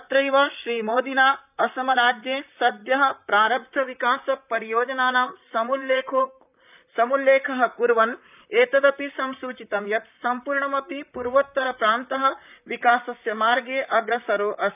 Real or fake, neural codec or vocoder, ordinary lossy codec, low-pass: fake; vocoder, 44.1 kHz, 128 mel bands, Pupu-Vocoder; none; 3.6 kHz